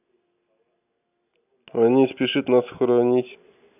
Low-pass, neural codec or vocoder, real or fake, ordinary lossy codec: 3.6 kHz; none; real; none